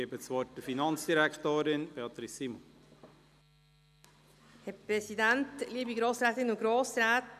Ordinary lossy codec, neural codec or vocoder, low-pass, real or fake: none; none; 14.4 kHz; real